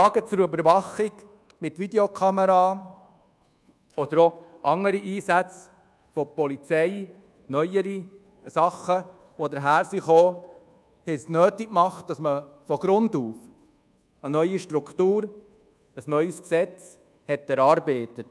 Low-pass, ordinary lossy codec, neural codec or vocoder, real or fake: none; none; codec, 24 kHz, 1.2 kbps, DualCodec; fake